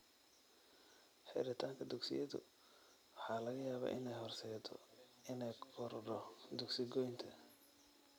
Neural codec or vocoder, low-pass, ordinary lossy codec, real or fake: vocoder, 44.1 kHz, 128 mel bands every 256 samples, BigVGAN v2; none; none; fake